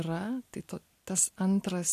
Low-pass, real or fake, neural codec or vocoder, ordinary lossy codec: 14.4 kHz; real; none; AAC, 64 kbps